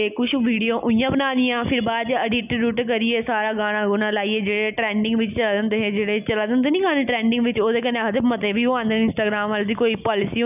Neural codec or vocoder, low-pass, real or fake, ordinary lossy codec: none; 3.6 kHz; real; none